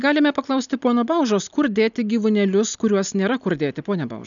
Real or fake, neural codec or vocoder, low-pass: real; none; 7.2 kHz